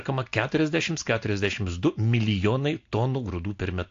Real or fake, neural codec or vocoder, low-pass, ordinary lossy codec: real; none; 7.2 kHz; AAC, 48 kbps